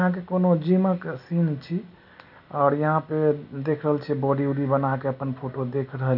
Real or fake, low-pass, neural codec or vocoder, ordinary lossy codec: real; 5.4 kHz; none; none